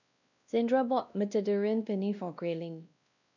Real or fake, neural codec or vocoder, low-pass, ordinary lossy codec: fake; codec, 16 kHz, 1 kbps, X-Codec, WavLM features, trained on Multilingual LibriSpeech; 7.2 kHz; none